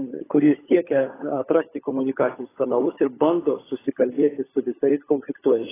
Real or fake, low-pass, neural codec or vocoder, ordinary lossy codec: fake; 3.6 kHz; codec, 16 kHz, 16 kbps, FunCodec, trained on LibriTTS, 50 frames a second; AAC, 16 kbps